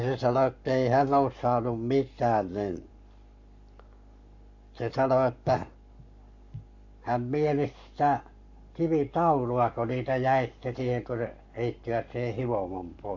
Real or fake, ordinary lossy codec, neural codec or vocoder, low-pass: fake; none; codec, 44.1 kHz, 7.8 kbps, Pupu-Codec; 7.2 kHz